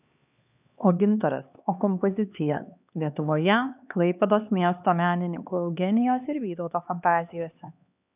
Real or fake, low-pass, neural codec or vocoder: fake; 3.6 kHz; codec, 16 kHz, 2 kbps, X-Codec, HuBERT features, trained on LibriSpeech